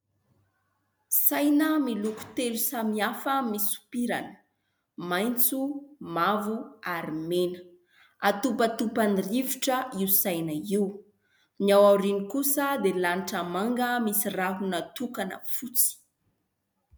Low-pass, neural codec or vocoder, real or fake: 19.8 kHz; none; real